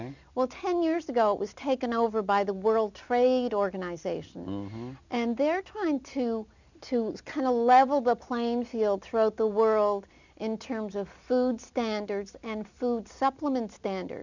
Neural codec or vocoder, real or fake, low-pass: none; real; 7.2 kHz